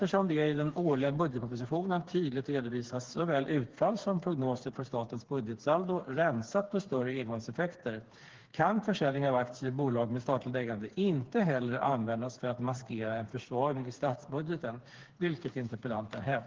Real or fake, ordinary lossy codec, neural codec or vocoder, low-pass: fake; Opus, 16 kbps; codec, 16 kHz, 4 kbps, FreqCodec, smaller model; 7.2 kHz